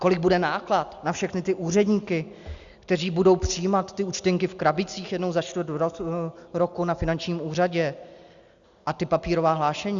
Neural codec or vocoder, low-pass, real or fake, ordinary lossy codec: none; 7.2 kHz; real; Opus, 64 kbps